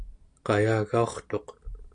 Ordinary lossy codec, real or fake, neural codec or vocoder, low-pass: AAC, 64 kbps; real; none; 9.9 kHz